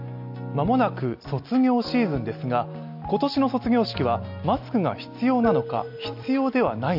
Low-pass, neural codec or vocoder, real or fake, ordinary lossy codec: 5.4 kHz; none; real; AAC, 48 kbps